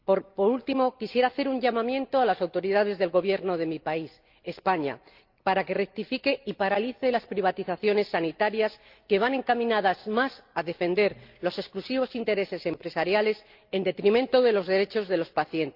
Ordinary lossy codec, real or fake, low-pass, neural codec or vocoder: Opus, 24 kbps; real; 5.4 kHz; none